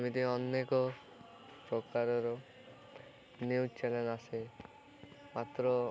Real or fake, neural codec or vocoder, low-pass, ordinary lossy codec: real; none; none; none